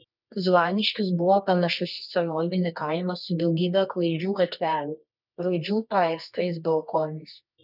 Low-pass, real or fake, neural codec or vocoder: 5.4 kHz; fake; codec, 24 kHz, 0.9 kbps, WavTokenizer, medium music audio release